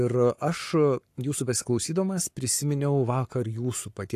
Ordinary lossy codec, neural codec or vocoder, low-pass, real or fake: AAC, 64 kbps; codec, 44.1 kHz, 7.8 kbps, Pupu-Codec; 14.4 kHz; fake